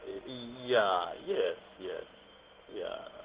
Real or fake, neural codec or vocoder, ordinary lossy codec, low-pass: fake; codec, 16 kHz in and 24 kHz out, 1 kbps, XY-Tokenizer; Opus, 16 kbps; 3.6 kHz